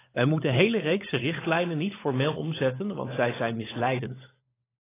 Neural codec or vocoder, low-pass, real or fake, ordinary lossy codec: codec, 16 kHz, 16 kbps, FunCodec, trained on LibriTTS, 50 frames a second; 3.6 kHz; fake; AAC, 16 kbps